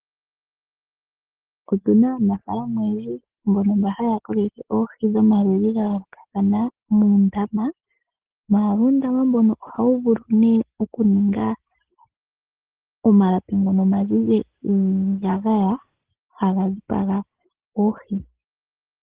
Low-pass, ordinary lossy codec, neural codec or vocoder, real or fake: 3.6 kHz; Opus, 16 kbps; none; real